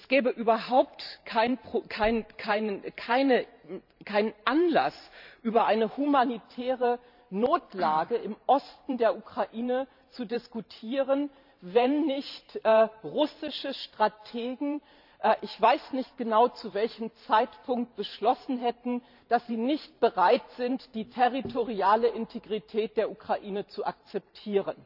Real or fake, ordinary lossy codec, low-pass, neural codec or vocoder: fake; none; 5.4 kHz; vocoder, 44.1 kHz, 128 mel bands every 512 samples, BigVGAN v2